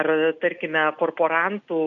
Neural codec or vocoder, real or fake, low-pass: none; real; 7.2 kHz